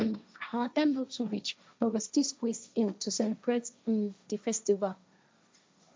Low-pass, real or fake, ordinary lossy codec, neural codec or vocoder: none; fake; none; codec, 16 kHz, 1.1 kbps, Voila-Tokenizer